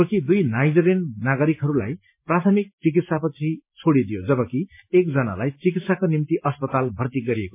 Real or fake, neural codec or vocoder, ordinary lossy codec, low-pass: real; none; MP3, 24 kbps; 3.6 kHz